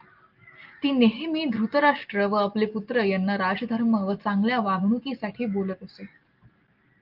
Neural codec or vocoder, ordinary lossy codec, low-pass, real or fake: none; Opus, 24 kbps; 5.4 kHz; real